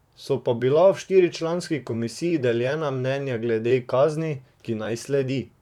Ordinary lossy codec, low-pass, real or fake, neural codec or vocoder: none; 19.8 kHz; fake; vocoder, 44.1 kHz, 128 mel bands, Pupu-Vocoder